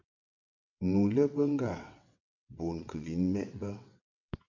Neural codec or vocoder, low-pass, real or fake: vocoder, 22.05 kHz, 80 mel bands, WaveNeXt; 7.2 kHz; fake